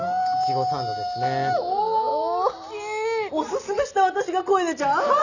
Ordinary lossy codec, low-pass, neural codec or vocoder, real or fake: none; 7.2 kHz; none; real